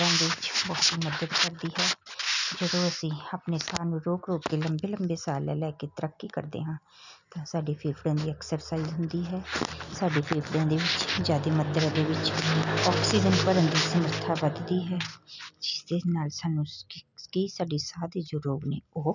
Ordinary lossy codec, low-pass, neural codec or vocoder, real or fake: none; 7.2 kHz; none; real